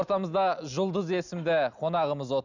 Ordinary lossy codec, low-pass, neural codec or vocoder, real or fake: none; 7.2 kHz; none; real